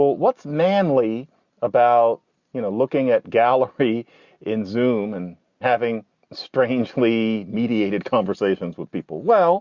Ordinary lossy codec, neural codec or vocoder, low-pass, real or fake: Opus, 64 kbps; none; 7.2 kHz; real